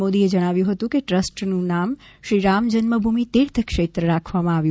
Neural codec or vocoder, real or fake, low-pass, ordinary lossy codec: none; real; none; none